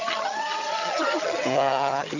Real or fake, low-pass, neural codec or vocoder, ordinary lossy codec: fake; 7.2 kHz; vocoder, 22.05 kHz, 80 mel bands, HiFi-GAN; none